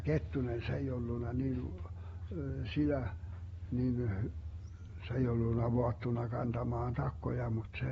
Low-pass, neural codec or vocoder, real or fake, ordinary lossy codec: 19.8 kHz; none; real; AAC, 24 kbps